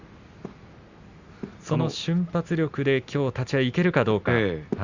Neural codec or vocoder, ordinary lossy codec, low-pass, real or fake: none; Opus, 64 kbps; 7.2 kHz; real